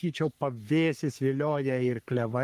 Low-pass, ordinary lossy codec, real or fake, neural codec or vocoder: 14.4 kHz; Opus, 32 kbps; fake; codec, 44.1 kHz, 3.4 kbps, Pupu-Codec